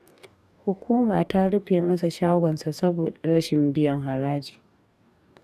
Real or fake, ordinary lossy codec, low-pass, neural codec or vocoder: fake; none; 14.4 kHz; codec, 44.1 kHz, 2.6 kbps, DAC